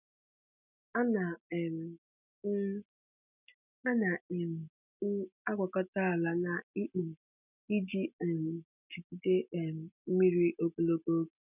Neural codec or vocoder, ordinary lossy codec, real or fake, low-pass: none; none; real; 3.6 kHz